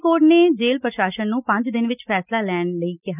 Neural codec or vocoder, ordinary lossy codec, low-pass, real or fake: none; none; 3.6 kHz; real